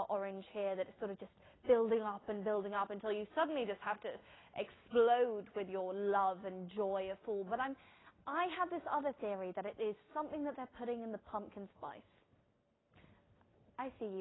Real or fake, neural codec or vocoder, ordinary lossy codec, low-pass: real; none; AAC, 16 kbps; 7.2 kHz